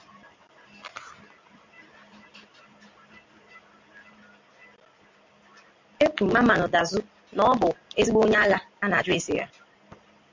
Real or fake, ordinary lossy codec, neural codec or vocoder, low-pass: real; MP3, 48 kbps; none; 7.2 kHz